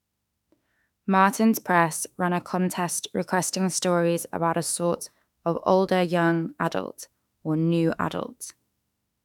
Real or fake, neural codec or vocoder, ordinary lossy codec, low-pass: fake; autoencoder, 48 kHz, 32 numbers a frame, DAC-VAE, trained on Japanese speech; none; 19.8 kHz